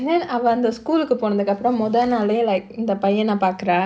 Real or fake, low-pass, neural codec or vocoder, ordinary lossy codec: real; none; none; none